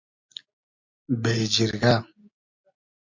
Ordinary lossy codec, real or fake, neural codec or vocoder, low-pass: AAC, 48 kbps; real; none; 7.2 kHz